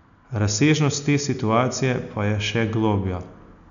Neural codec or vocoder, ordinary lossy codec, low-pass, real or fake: none; none; 7.2 kHz; real